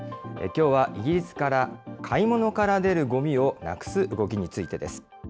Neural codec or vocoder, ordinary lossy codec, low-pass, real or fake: none; none; none; real